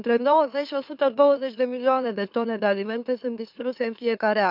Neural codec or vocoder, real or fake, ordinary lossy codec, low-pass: autoencoder, 44.1 kHz, a latent of 192 numbers a frame, MeloTTS; fake; none; 5.4 kHz